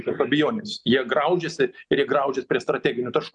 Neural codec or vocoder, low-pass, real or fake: none; 9.9 kHz; real